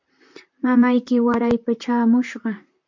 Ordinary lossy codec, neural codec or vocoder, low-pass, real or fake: MP3, 48 kbps; vocoder, 44.1 kHz, 128 mel bands, Pupu-Vocoder; 7.2 kHz; fake